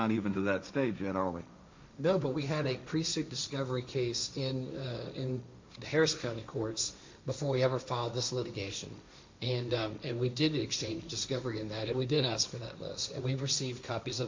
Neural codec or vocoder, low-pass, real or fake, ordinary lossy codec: codec, 16 kHz, 1.1 kbps, Voila-Tokenizer; 7.2 kHz; fake; MP3, 64 kbps